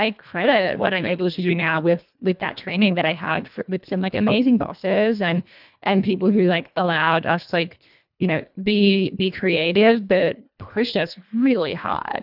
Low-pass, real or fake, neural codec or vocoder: 5.4 kHz; fake; codec, 24 kHz, 1.5 kbps, HILCodec